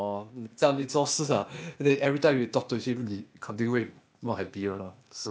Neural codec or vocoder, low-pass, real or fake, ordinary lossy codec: codec, 16 kHz, 0.8 kbps, ZipCodec; none; fake; none